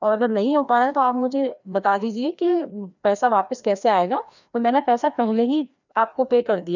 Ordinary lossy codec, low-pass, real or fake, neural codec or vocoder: none; 7.2 kHz; fake; codec, 16 kHz, 1 kbps, FreqCodec, larger model